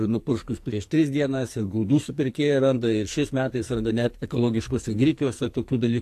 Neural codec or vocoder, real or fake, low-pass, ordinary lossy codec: codec, 44.1 kHz, 2.6 kbps, SNAC; fake; 14.4 kHz; AAC, 64 kbps